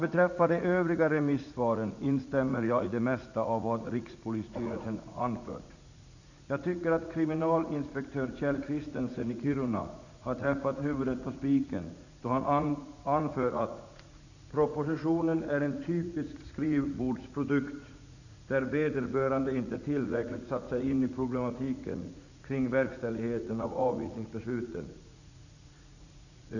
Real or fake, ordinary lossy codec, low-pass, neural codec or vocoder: fake; none; 7.2 kHz; vocoder, 44.1 kHz, 80 mel bands, Vocos